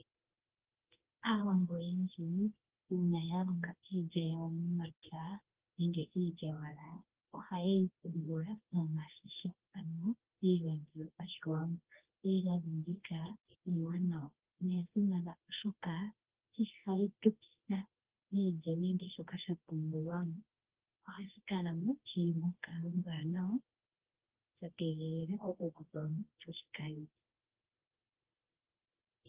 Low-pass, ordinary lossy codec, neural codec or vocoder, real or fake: 3.6 kHz; Opus, 16 kbps; codec, 24 kHz, 0.9 kbps, WavTokenizer, medium music audio release; fake